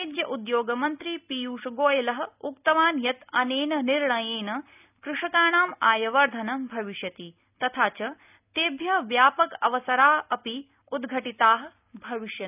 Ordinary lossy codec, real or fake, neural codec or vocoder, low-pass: none; real; none; 3.6 kHz